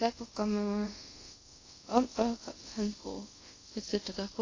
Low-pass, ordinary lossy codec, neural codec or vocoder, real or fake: 7.2 kHz; AAC, 32 kbps; codec, 24 kHz, 0.5 kbps, DualCodec; fake